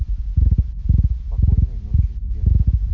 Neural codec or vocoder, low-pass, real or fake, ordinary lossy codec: none; 7.2 kHz; real; none